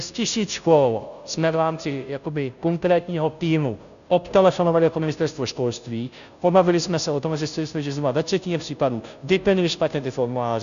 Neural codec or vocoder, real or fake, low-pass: codec, 16 kHz, 0.5 kbps, FunCodec, trained on Chinese and English, 25 frames a second; fake; 7.2 kHz